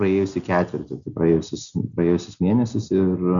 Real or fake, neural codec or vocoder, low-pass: real; none; 7.2 kHz